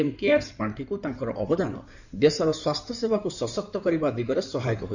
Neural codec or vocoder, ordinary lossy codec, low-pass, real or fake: codec, 16 kHz in and 24 kHz out, 2.2 kbps, FireRedTTS-2 codec; none; 7.2 kHz; fake